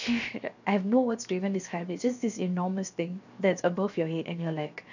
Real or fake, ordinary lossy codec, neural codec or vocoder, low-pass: fake; none; codec, 16 kHz, 0.7 kbps, FocalCodec; 7.2 kHz